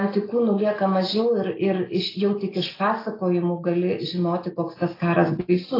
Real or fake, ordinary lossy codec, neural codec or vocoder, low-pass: real; AAC, 24 kbps; none; 5.4 kHz